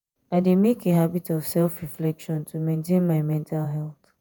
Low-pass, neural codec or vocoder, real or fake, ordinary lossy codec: none; vocoder, 48 kHz, 128 mel bands, Vocos; fake; none